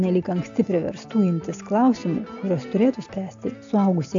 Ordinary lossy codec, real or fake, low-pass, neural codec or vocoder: AAC, 64 kbps; real; 7.2 kHz; none